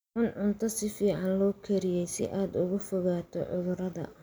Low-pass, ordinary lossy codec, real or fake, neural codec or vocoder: none; none; real; none